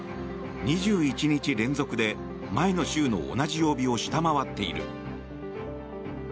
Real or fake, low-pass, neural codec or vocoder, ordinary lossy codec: real; none; none; none